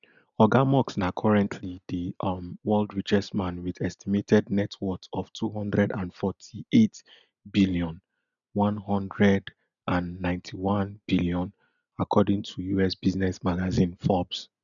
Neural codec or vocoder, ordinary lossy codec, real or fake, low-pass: none; none; real; 7.2 kHz